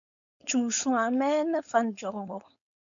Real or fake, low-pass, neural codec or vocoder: fake; 7.2 kHz; codec, 16 kHz, 4.8 kbps, FACodec